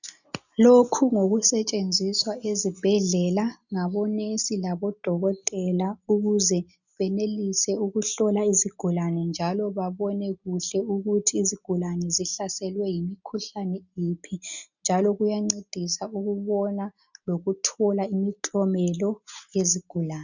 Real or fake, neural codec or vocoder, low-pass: real; none; 7.2 kHz